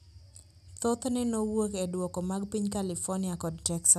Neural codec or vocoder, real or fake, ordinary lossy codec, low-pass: none; real; none; 14.4 kHz